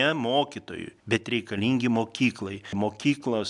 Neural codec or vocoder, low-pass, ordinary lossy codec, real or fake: none; 10.8 kHz; MP3, 96 kbps; real